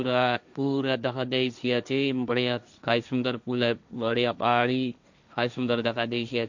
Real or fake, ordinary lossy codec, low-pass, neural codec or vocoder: fake; none; 7.2 kHz; codec, 16 kHz, 1.1 kbps, Voila-Tokenizer